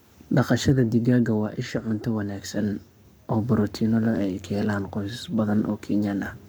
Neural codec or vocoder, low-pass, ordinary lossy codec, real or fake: codec, 44.1 kHz, 7.8 kbps, Pupu-Codec; none; none; fake